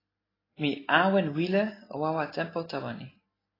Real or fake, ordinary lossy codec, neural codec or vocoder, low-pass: real; AAC, 24 kbps; none; 5.4 kHz